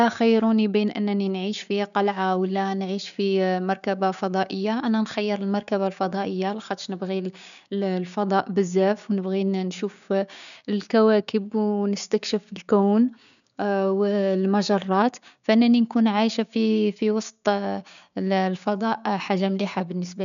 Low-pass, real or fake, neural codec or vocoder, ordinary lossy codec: 7.2 kHz; fake; codec, 16 kHz, 6 kbps, DAC; none